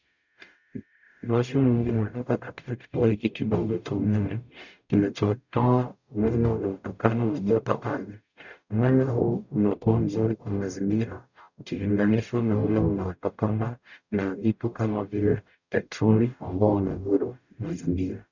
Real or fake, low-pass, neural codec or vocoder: fake; 7.2 kHz; codec, 44.1 kHz, 0.9 kbps, DAC